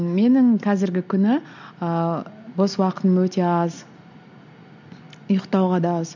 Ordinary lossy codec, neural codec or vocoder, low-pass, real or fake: none; none; 7.2 kHz; real